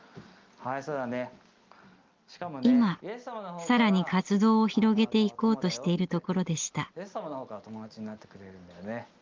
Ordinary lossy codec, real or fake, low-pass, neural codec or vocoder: Opus, 32 kbps; real; 7.2 kHz; none